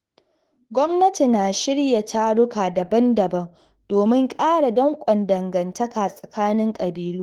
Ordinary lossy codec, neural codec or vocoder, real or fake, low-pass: Opus, 16 kbps; autoencoder, 48 kHz, 32 numbers a frame, DAC-VAE, trained on Japanese speech; fake; 19.8 kHz